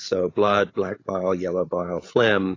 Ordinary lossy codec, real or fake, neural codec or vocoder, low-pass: AAC, 32 kbps; fake; codec, 16 kHz, 8 kbps, FreqCodec, larger model; 7.2 kHz